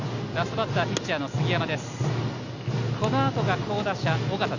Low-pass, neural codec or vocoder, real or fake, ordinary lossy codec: 7.2 kHz; none; real; none